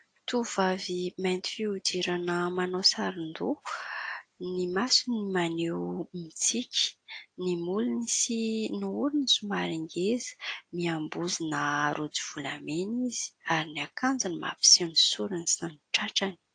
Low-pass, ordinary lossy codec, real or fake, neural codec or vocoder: 9.9 kHz; AAC, 48 kbps; real; none